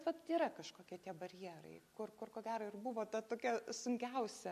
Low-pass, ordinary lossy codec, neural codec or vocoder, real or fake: 14.4 kHz; AAC, 96 kbps; none; real